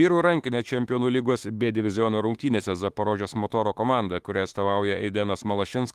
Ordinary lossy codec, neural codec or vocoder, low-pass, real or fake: Opus, 24 kbps; autoencoder, 48 kHz, 32 numbers a frame, DAC-VAE, trained on Japanese speech; 14.4 kHz; fake